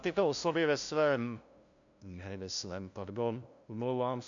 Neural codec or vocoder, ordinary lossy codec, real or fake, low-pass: codec, 16 kHz, 0.5 kbps, FunCodec, trained on LibriTTS, 25 frames a second; MP3, 64 kbps; fake; 7.2 kHz